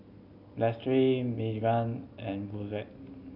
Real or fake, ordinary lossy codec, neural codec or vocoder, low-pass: real; none; none; 5.4 kHz